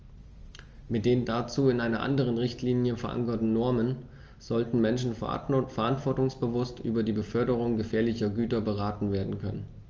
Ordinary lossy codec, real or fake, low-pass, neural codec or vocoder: Opus, 24 kbps; real; 7.2 kHz; none